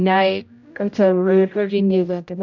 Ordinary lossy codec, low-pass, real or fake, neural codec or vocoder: none; 7.2 kHz; fake; codec, 16 kHz, 0.5 kbps, X-Codec, HuBERT features, trained on general audio